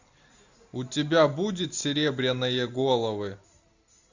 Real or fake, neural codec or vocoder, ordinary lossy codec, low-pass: real; none; Opus, 64 kbps; 7.2 kHz